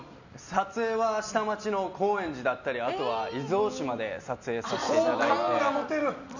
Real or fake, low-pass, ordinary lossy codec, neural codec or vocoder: real; 7.2 kHz; none; none